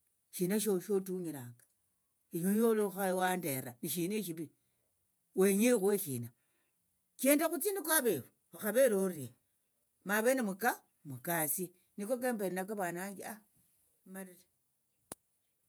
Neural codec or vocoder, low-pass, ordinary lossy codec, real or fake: vocoder, 48 kHz, 128 mel bands, Vocos; none; none; fake